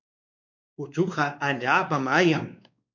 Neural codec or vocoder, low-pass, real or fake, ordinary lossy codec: codec, 16 kHz, 2 kbps, X-Codec, WavLM features, trained on Multilingual LibriSpeech; 7.2 kHz; fake; MP3, 64 kbps